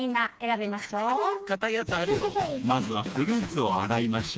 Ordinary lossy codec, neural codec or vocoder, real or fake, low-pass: none; codec, 16 kHz, 2 kbps, FreqCodec, smaller model; fake; none